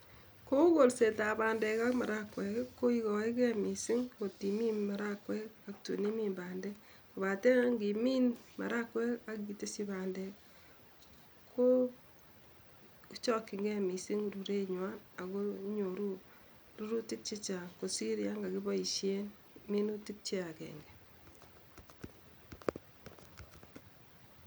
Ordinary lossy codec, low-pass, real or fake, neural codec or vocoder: none; none; real; none